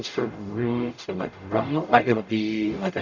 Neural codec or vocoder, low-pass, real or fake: codec, 44.1 kHz, 0.9 kbps, DAC; 7.2 kHz; fake